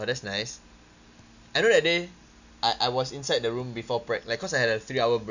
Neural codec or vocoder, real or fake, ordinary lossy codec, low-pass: none; real; none; 7.2 kHz